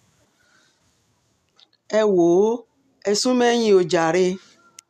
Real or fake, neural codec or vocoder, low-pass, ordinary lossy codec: real; none; 14.4 kHz; none